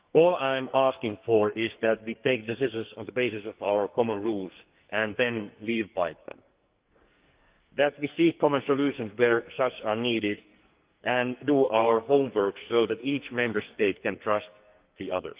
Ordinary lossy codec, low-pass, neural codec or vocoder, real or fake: Opus, 32 kbps; 3.6 kHz; codec, 44.1 kHz, 2.6 kbps, SNAC; fake